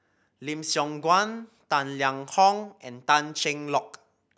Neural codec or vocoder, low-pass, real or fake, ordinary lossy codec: none; none; real; none